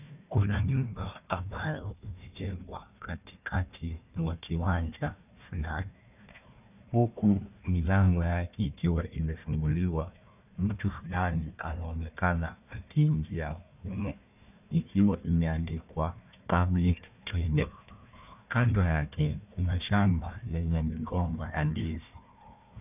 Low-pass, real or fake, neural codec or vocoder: 3.6 kHz; fake; codec, 16 kHz, 1 kbps, FunCodec, trained on Chinese and English, 50 frames a second